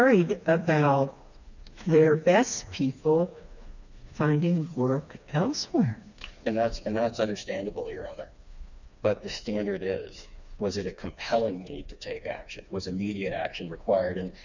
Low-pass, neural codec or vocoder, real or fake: 7.2 kHz; codec, 16 kHz, 2 kbps, FreqCodec, smaller model; fake